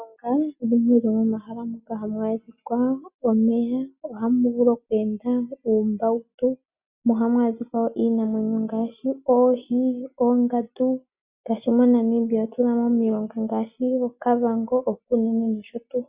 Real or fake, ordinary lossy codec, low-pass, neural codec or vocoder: real; Opus, 64 kbps; 3.6 kHz; none